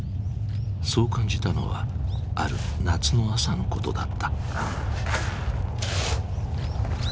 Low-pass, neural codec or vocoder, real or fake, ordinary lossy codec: none; none; real; none